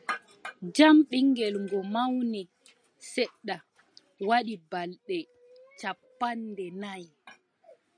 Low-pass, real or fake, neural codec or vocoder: 9.9 kHz; real; none